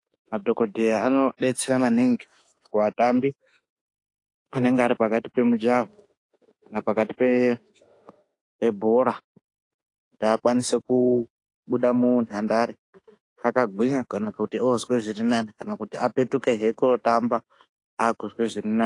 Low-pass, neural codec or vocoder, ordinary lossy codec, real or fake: 10.8 kHz; autoencoder, 48 kHz, 32 numbers a frame, DAC-VAE, trained on Japanese speech; AAC, 48 kbps; fake